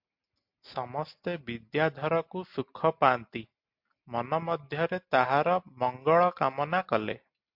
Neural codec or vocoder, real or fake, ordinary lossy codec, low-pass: none; real; MP3, 48 kbps; 5.4 kHz